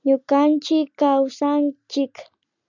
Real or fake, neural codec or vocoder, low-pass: real; none; 7.2 kHz